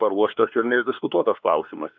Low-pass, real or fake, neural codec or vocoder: 7.2 kHz; fake; codec, 16 kHz, 2 kbps, X-Codec, WavLM features, trained on Multilingual LibriSpeech